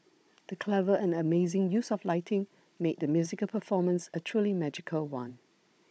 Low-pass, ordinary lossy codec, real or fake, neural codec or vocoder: none; none; fake; codec, 16 kHz, 16 kbps, FunCodec, trained on Chinese and English, 50 frames a second